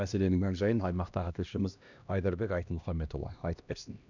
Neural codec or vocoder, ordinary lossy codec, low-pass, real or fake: codec, 16 kHz, 1 kbps, X-Codec, HuBERT features, trained on LibriSpeech; none; 7.2 kHz; fake